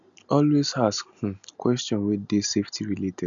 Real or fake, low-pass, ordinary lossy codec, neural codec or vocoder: real; 7.2 kHz; none; none